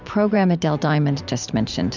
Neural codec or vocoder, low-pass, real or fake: vocoder, 44.1 kHz, 128 mel bands every 512 samples, BigVGAN v2; 7.2 kHz; fake